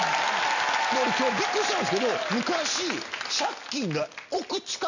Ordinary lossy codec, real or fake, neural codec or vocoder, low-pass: none; real; none; 7.2 kHz